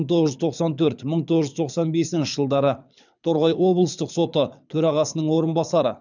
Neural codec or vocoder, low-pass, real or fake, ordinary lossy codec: codec, 24 kHz, 6 kbps, HILCodec; 7.2 kHz; fake; none